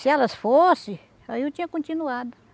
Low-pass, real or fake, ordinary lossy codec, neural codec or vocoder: none; real; none; none